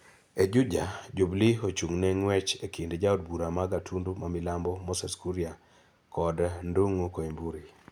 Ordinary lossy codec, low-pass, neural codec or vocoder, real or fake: none; 19.8 kHz; none; real